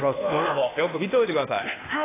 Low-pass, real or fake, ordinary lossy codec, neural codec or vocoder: 3.6 kHz; fake; AAC, 16 kbps; codec, 16 kHz, 0.8 kbps, ZipCodec